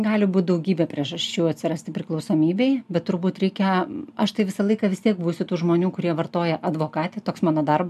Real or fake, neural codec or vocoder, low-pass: real; none; 14.4 kHz